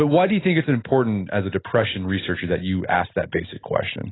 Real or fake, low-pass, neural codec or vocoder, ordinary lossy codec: real; 7.2 kHz; none; AAC, 16 kbps